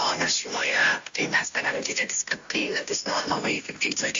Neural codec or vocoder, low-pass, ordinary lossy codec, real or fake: codec, 16 kHz, 0.5 kbps, FunCodec, trained on Chinese and English, 25 frames a second; 7.2 kHz; AAC, 64 kbps; fake